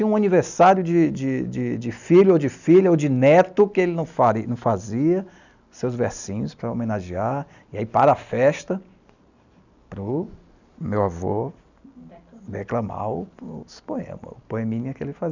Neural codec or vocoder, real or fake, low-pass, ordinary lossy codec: none; real; 7.2 kHz; none